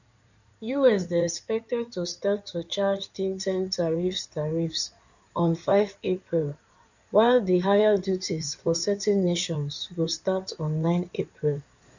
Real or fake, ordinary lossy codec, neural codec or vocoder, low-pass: fake; none; codec, 16 kHz in and 24 kHz out, 2.2 kbps, FireRedTTS-2 codec; 7.2 kHz